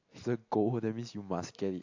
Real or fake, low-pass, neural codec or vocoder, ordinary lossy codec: real; 7.2 kHz; none; AAC, 48 kbps